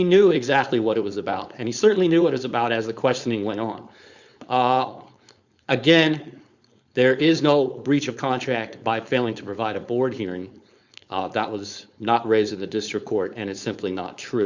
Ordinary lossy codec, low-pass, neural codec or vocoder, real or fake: Opus, 64 kbps; 7.2 kHz; codec, 16 kHz, 4.8 kbps, FACodec; fake